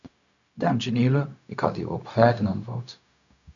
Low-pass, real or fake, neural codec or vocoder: 7.2 kHz; fake; codec, 16 kHz, 0.4 kbps, LongCat-Audio-Codec